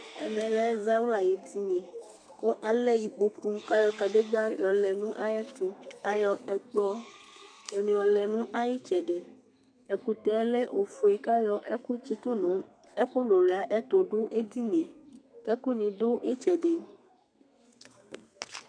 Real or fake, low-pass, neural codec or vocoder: fake; 9.9 kHz; codec, 32 kHz, 1.9 kbps, SNAC